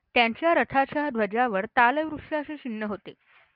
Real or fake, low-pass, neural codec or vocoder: real; 5.4 kHz; none